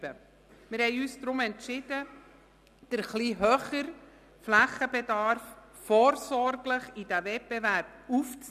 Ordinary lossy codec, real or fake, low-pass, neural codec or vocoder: none; real; 14.4 kHz; none